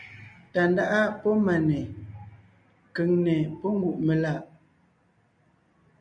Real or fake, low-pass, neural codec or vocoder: real; 9.9 kHz; none